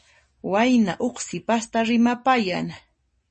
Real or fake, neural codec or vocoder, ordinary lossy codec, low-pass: real; none; MP3, 32 kbps; 10.8 kHz